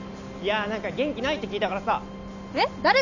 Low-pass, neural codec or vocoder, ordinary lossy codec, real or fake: 7.2 kHz; none; none; real